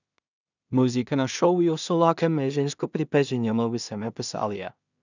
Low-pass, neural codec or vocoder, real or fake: 7.2 kHz; codec, 16 kHz in and 24 kHz out, 0.4 kbps, LongCat-Audio-Codec, two codebook decoder; fake